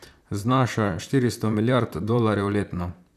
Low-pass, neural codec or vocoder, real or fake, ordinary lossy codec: 14.4 kHz; vocoder, 44.1 kHz, 128 mel bands, Pupu-Vocoder; fake; none